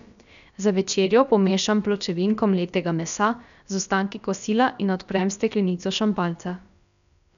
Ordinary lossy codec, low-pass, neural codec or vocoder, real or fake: none; 7.2 kHz; codec, 16 kHz, about 1 kbps, DyCAST, with the encoder's durations; fake